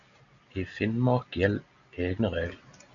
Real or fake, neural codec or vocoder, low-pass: real; none; 7.2 kHz